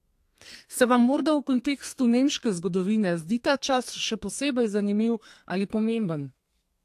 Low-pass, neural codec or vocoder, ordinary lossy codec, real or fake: 14.4 kHz; codec, 32 kHz, 1.9 kbps, SNAC; AAC, 64 kbps; fake